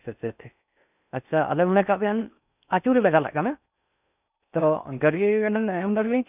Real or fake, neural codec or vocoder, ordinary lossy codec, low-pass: fake; codec, 16 kHz in and 24 kHz out, 0.6 kbps, FocalCodec, streaming, 4096 codes; none; 3.6 kHz